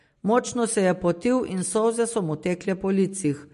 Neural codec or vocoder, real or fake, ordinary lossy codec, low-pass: none; real; MP3, 48 kbps; 14.4 kHz